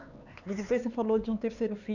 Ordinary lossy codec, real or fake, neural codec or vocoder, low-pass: none; fake; codec, 16 kHz, 4 kbps, X-Codec, HuBERT features, trained on LibriSpeech; 7.2 kHz